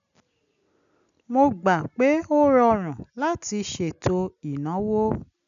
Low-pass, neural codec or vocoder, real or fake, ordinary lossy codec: 7.2 kHz; none; real; none